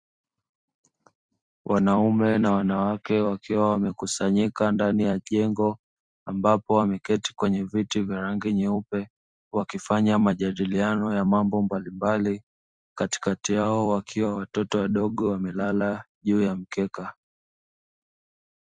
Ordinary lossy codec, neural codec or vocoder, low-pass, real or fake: Opus, 64 kbps; vocoder, 44.1 kHz, 128 mel bands every 256 samples, BigVGAN v2; 9.9 kHz; fake